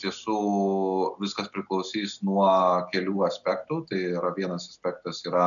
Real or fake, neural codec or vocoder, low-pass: real; none; 7.2 kHz